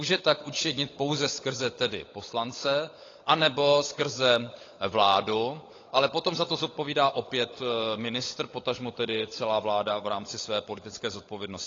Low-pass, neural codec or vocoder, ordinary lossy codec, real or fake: 7.2 kHz; codec, 16 kHz, 16 kbps, FunCodec, trained on LibriTTS, 50 frames a second; AAC, 32 kbps; fake